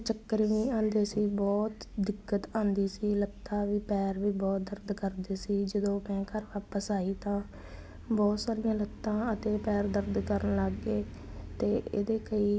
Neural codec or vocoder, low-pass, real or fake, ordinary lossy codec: none; none; real; none